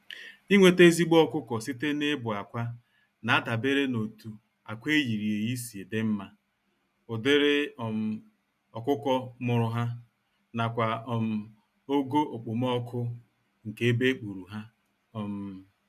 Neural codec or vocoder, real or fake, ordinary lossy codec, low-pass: none; real; none; 14.4 kHz